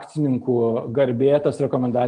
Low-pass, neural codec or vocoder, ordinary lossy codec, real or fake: 9.9 kHz; none; Opus, 32 kbps; real